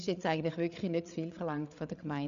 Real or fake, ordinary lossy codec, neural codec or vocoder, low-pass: fake; none; codec, 16 kHz, 8 kbps, FreqCodec, larger model; 7.2 kHz